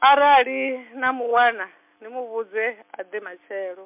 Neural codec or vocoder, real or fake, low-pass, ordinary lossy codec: none; real; 3.6 kHz; MP3, 32 kbps